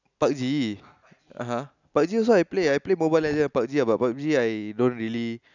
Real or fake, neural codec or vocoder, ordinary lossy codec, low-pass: real; none; none; 7.2 kHz